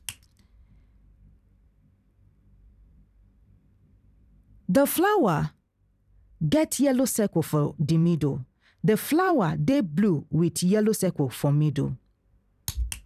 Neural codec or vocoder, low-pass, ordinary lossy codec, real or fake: vocoder, 44.1 kHz, 128 mel bands every 256 samples, BigVGAN v2; 14.4 kHz; none; fake